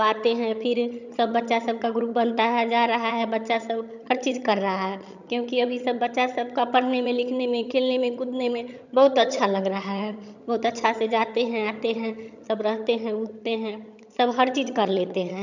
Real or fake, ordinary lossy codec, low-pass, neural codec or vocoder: fake; none; 7.2 kHz; vocoder, 22.05 kHz, 80 mel bands, HiFi-GAN